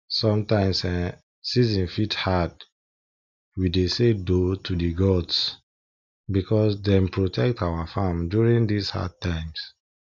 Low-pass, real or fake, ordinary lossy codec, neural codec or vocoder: 7.2 kHz; real; none; none